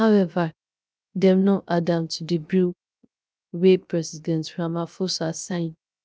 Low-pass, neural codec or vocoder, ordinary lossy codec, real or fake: none; codec, 16 kHz, 0.3 kbps, FocalCodec; none; fake